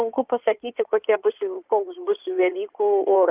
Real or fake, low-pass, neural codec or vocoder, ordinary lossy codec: fake; 3.6 kHz; codec, 16 kHz in and 24 kHz out, 2.2 kbps, FireRedTTS-2 codec; Opus, 24 kbps